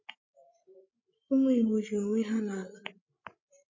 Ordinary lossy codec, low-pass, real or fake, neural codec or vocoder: MP3, 32 kbps; 7.2 kHz; fake; codec, 16 kHz, 16 kbps, FreqCodec, larger model